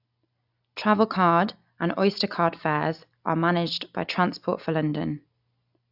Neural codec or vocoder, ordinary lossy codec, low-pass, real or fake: none; AAC, 48 kbps; 5.4 kHz; real